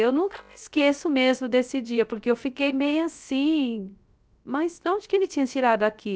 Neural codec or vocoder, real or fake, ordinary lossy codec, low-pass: codec, 16 kHz, 0.3 kbps, FocalCodec; fake; none; none